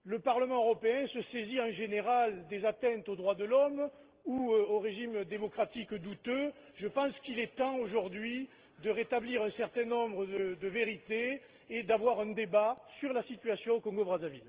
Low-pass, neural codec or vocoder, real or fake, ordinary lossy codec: 3.6 kHz; none; real; Opus, 16 kbps